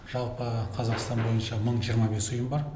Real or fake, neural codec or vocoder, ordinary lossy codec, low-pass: real; none; none; none